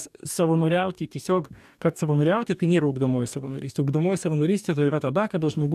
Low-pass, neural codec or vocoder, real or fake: 14.4 kHz; codec, 44.1 kHz, 2.6 kbps, DAC; fake